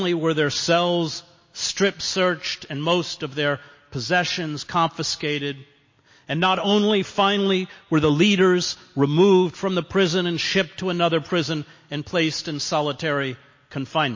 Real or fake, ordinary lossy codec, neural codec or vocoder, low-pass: real; MP3, 32 kbps; none; 7.2 kHz